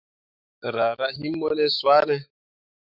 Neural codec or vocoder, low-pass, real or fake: autoencoder, 48 kHz, 128 numbers a frame, DAC-VAE, trained on Japanese speech; 5.4 kHz; fake